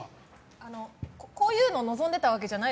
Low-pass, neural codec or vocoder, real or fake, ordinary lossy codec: none; none; real; none